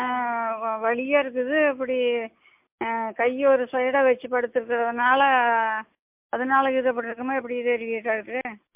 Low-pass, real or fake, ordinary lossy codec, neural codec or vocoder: 3.6 kHz; real; MP3, 32 kbps; none